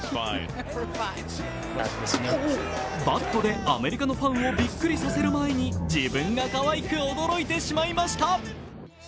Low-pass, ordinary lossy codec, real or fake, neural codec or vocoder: none; none; real; none